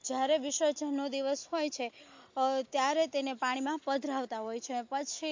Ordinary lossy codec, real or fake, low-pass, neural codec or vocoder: MP3, 48 kbps; real; 7.2 kHz; none